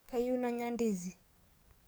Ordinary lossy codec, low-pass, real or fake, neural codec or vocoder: none; none; fake; codec, 44.1 kHz, 7.8 kbps, DAC